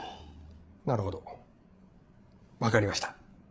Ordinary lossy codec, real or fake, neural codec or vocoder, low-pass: none; fake; codec, 16 kHz, 8 kbps, FreqCodec, larger model; none